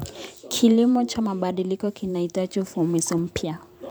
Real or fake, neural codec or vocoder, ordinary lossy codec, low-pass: fake; vocoder, 44.1 kHz, 128 mel bands every 512 samples, BigVGAN v2; none; none